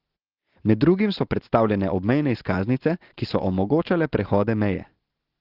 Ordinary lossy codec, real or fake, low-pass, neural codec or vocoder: Opus, 16 kbps; real; 5.4 kHz; none